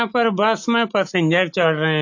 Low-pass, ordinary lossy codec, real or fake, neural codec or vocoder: 7.2 kHz; AAC, 48 kbps; real; none